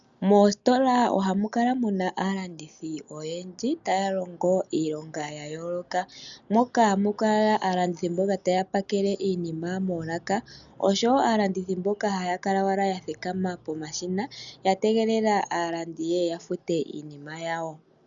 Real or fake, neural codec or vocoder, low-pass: real; none; 7.2 kHz